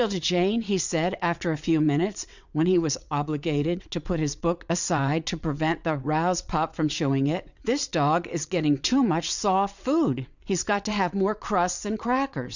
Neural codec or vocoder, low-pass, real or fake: vocoder, 22.05 kHz, 80 mel bands, WaveNeXt; 7.2 kHz; fake